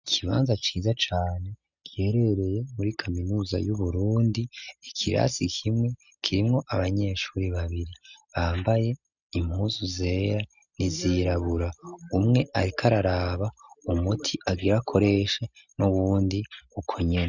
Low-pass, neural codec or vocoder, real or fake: 7.2 kHz; none; real